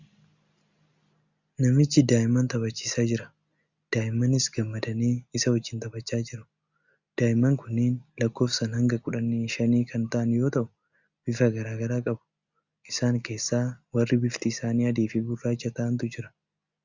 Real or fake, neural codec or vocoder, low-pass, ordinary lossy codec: real; none; 7.2 kHz; Opus, 64 kbps